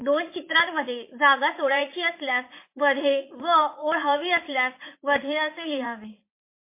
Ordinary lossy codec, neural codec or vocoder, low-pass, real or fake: MP3, 24 kbps; vocoder, 44.1 kHz, 80 mel bands, Vocos; 3.6 kHz; fake